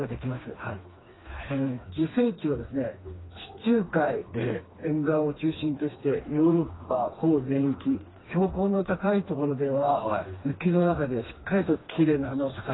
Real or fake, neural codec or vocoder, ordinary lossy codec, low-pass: fake; codec, 16 kHz, 2 kbps, FreqCodec, smaller model; AAC, 16 kbps; 7.2 kHz